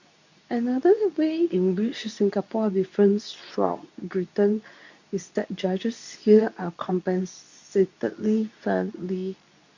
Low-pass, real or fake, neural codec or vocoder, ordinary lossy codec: 7.2 kHz; fake; codec, 24 kHz, 0.9 kbps, WavTokenizer, medium speech release version 2; none